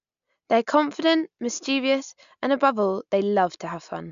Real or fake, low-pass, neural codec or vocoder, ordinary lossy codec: real; 7.2 kHz; none; MP3, 64 kbps